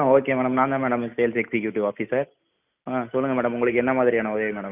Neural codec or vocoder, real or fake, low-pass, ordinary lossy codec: none; real; 3.6 kHz; none